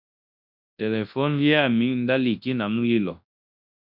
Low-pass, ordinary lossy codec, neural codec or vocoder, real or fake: 5.4 kHz; MP3, 48 kbps; codec, 24 kHz, 0.9 kbps, WavTokenizer, large speech release; fake